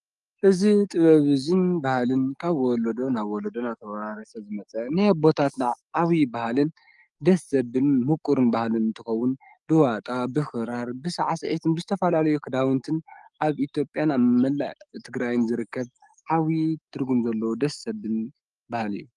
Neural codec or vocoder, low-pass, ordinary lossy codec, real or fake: codec, 44.1 kHz, 7.8 kbps, DAC; 10.8 kHz; Opus, 32 kbps; fake